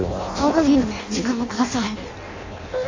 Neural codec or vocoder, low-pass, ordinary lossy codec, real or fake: codec, 16 kHz in and 24 kHz out, 0.6 kbps, FireRedTTS-2 codec; 7.2 kHz; AAC, 48 kbps; fake